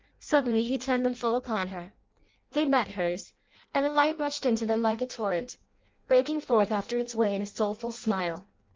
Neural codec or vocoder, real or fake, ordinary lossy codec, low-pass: codec, 16 kHz in and 24 kHz out, 0.6 kbps, FireRedTTS-2 codec; fake; Opus, 32 kbps; 7.2 kHz